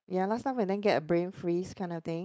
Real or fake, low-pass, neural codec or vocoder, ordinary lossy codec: fake; none; codec, 16 kHz, 4.8 kbps, FACodec; none